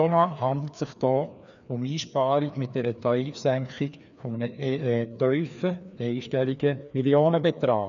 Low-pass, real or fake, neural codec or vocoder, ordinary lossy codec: 7.2 kHz; fake; codec, 16 kHz, 2 kbps, FreqCodec, larger model; none